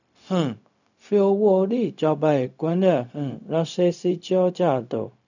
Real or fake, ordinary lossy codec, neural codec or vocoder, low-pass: fake; none; codec, 16 kHz, 0.4 kbps, LongCat-Audio-Codec; 7.2 kHz